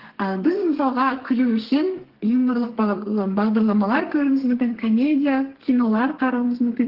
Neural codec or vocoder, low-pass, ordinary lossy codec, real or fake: codec, 32 kHz, 1.9 kbps, SNAC; 5.4 kHz; Opus, 16 kbps; fake